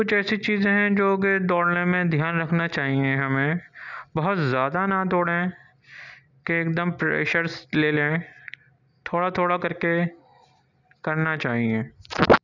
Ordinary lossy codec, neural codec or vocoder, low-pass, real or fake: none; none; 7.2 kHz; real